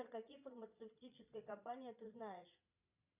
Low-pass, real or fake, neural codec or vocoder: 3.6 kHz; fake; vocoder, 22.05 kHz, 80 mel bands, Vocos